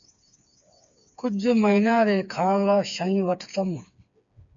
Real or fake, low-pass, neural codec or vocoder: fake; 7.2 kHz; codec, 16 kHz, 4 kbps, FreqCodec, smaller model